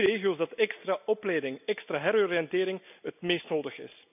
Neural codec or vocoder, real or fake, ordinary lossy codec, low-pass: none; real; none; 3.6 kHz